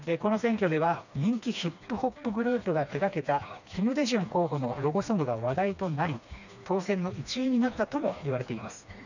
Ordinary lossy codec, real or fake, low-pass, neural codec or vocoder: none; fake; 7.2 kHz; codec, 16 kHz, 2 kbps, FreqCodec, smaller model